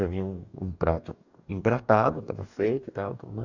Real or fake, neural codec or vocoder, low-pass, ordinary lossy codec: fake; codec, 44.1 kHz, 2.6 kbps, DAC; 7.2 kHz; none